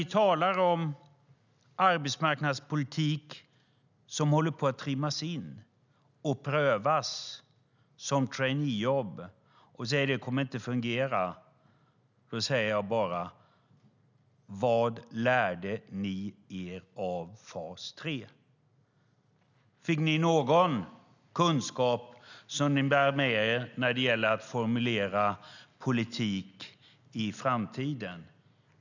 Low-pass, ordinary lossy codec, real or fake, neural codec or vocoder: 7.2 kHz; none; real; none